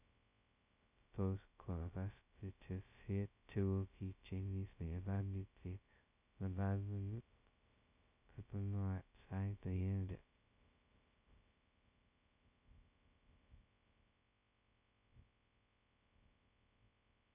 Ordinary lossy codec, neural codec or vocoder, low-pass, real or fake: none; codec, 16 kHz, 0.2 kbps, FocalCodec; 3.6 kHz; fake